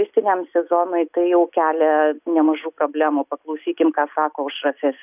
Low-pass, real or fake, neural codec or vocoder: 3.6 kHz; real; none